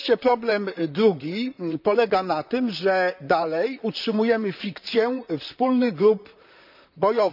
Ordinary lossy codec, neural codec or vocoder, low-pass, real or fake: none; vocoder, 44.1 kHz, 128 mel bands, Pupu-Vocoder; 5.4 kHz; fake